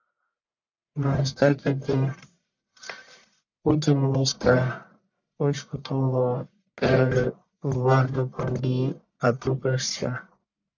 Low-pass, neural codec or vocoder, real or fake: 7.2 kHz; codec, 44.1 kHz, 1.7 kbps, Pupu-Codec; fake